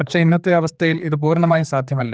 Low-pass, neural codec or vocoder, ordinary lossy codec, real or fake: none; codec, 16 kHz, 2 kbps, X-Codec, HuBERT features, trained on general audio; none; fake